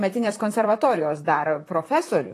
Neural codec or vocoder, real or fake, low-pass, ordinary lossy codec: vocoder, 44.1 kHz, 128 mel bands, Pupu-Vocoder; fake; 14.4 kHz; AAC, 48 kbps